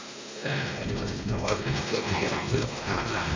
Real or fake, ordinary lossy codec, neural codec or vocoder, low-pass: fake; MP3, 64 kbps; codec, 16 kHz, 1 kbps, X-Codec, WavLM features, trained on Multilingual LibriSpeech; 7.2 kHz